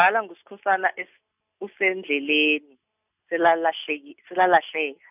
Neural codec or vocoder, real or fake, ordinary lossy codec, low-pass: none; real; none; 3.6 kHz